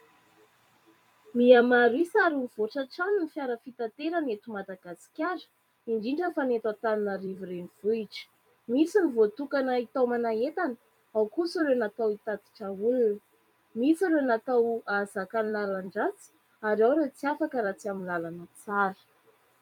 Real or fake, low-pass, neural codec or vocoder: fake; 19.8 kHz; vocoder, 44.1 kHz, 128 mel bands every 256 samples, BigVGAN v2